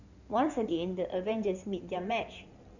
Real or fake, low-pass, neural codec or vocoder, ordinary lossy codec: fake; 7.2 kHz; codec, 16 kHz in and 24 kHz out, 2.2 kbps, FireRedTTS-2 codec; none